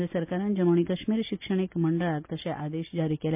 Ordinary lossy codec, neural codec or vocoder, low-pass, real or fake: MP3, 32 kbps; none; 3.6 kHz; real